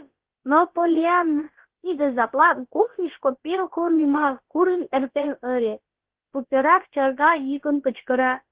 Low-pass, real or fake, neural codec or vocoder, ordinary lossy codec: 3.6 kHz; fake; codec, 16 kHz, about 1 kbps, DyCAST, with the encoder's durations; Opus, 16 kbps